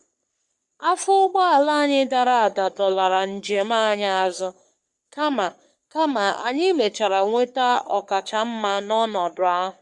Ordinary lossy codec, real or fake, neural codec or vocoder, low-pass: Opus, 64 kbps; fake; codec, 44.1 kHz, 3.4 kbps, Pupu-Codec; 10.8 kHz